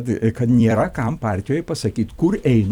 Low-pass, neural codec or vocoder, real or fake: 19.8 kHz; vocoder, 44.1 kHz, 128 mel bands every 256 samples, BigVGAN v2; fake